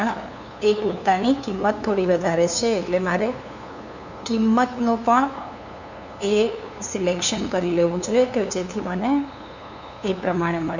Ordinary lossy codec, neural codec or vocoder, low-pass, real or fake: none; codec, 16 kHz, 2 kbps, FunCodec, trained on LibriTTS, 25 frames a second; 7.2 kHz; fake